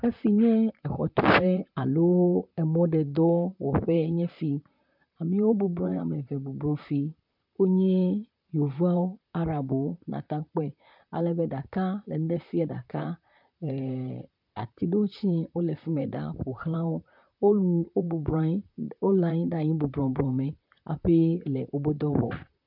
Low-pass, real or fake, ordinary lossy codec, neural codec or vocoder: 5.4 kHz; fake; MP3, 48 kbps; vocoder, 44.1 kHz, 128 mel bands, Pupu-Vocoder